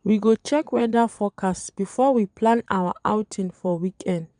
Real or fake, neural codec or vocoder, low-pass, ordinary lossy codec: fake; vocoder, 22.05 kHz, 80 mel bands, Vocos; 9.9 kHz; none